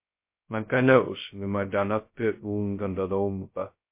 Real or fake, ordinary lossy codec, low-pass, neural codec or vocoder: fake; MP3, 24 kbps; 3.6 kHz; codec, 16 kHz, 0.2 kbps, FocalCodec